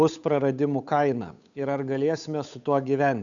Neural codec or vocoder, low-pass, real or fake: codec, 16 kHz, 8 kbps, FunCodec, trained on Chinese and English, 25 frames a second; 7.2 kHz; fake